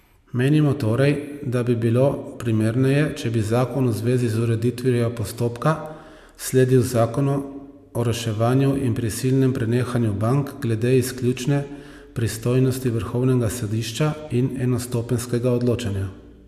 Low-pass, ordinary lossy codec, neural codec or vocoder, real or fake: 14.4 kHz; AAC, 96 kbps; none; real